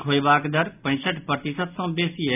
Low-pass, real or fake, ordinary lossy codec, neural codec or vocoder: 3.6 kHz; real; none; none